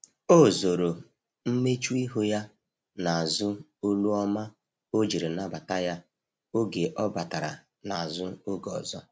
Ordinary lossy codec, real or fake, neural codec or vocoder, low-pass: none; real; none; none